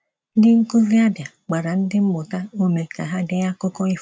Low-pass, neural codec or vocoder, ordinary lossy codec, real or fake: none; none; none; real